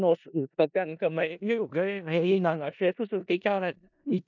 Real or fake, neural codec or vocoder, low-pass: fake; codec, 16 kHz in and 24 kHz out, 0.4 kbps, LongCat-Audio-Codec, four codebook decoder; 7.2 kHz